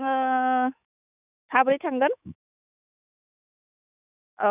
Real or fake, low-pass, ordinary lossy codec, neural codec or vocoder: fake; 3.6 kHz; none; autoencoder, 48 kHz, 128 numbers a frame, DAC-VAE, trained on Japanese speech